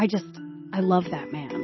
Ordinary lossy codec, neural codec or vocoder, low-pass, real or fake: MP3, 24 kbps; none; 7.2 kHz; real